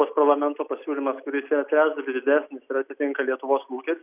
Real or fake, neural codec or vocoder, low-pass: real; none; 3.6 kHz